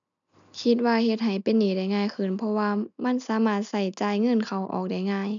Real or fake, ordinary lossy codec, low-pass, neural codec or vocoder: real; none; 7.2 kHz; none